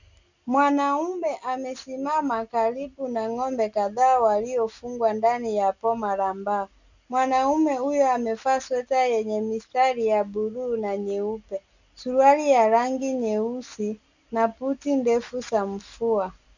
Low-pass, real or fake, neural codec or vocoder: 7.2 kHz; real; none